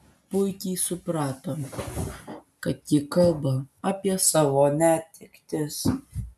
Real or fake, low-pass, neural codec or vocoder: real; 14.4 kHz; none